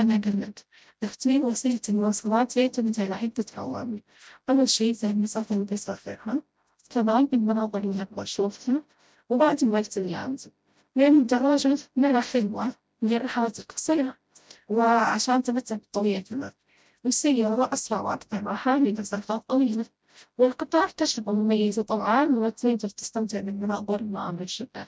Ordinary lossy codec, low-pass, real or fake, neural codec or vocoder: none; none; fake; codec, 16 kHz, 0.5 kbps, FreqCodec, smaller model